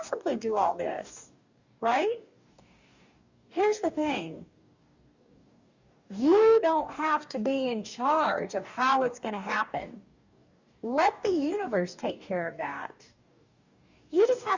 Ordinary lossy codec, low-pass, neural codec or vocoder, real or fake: Opus, 64 kbps; 7.2 kHz; codec, 44.1 kHz, 2.6 kbps, DAC; fake